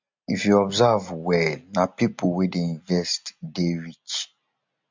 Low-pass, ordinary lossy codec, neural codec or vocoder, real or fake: 7.2 kHz; MP3, 64 kbps; none; real